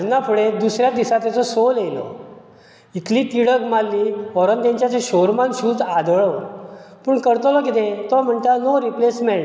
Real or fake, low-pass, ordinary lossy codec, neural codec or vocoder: real; none; none; none